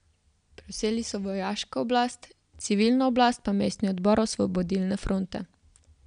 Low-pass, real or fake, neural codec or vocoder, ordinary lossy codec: 9.9 kHz; real; none; none